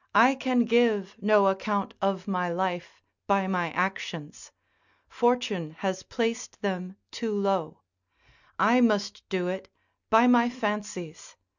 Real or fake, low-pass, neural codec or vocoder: real; 7.2 kHz; none